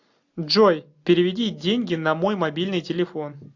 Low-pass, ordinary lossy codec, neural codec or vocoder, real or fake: 7.2 kHz; AAC, 48 kbps; none; real